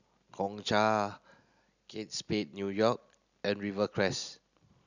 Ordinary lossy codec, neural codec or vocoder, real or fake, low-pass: none; none; real; 7.2 kHz